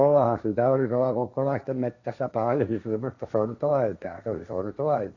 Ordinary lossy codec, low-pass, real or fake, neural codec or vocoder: none; 7.2 kHz; fake; codec, 16 kHz, 1.1 kbps, Voila-Tokenizer